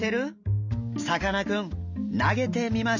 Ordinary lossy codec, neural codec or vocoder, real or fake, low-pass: none; none; real; 7.2 kHz